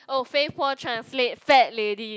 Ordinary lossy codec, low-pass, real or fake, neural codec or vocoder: none; none; real; none